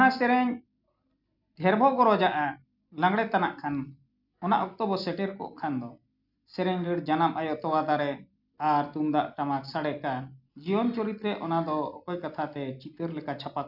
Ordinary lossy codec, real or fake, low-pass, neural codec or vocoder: none; real; 5.4 kHz; none